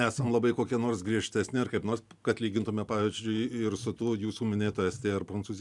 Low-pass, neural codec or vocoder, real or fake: 10.8 kHz; none; real